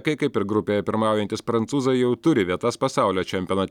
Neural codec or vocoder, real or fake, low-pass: none; real; 19.8 kHz